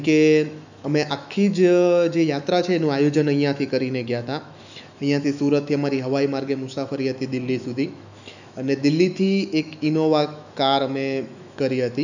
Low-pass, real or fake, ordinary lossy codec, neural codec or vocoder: 7.2 kHz; fake; none; autoencoder, 48 kHz, 128 numbers a frame, DAC-VAE, trained on Japanese speech